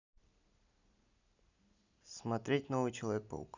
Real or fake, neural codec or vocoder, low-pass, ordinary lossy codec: real; none; 7.2 kHz; none